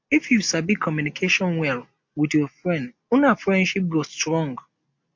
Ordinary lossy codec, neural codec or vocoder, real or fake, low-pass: MP3, 48 kbps; none; real; 7.2 kHz